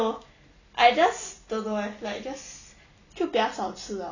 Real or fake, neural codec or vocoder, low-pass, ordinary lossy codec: real; none; 7.2 kHz; none